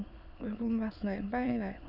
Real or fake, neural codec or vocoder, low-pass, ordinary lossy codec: fake; autoencoder, 22.05 kHz, a latent of 192 numbers a frame, VITS, trained on many speakers; 5.4 kHz; none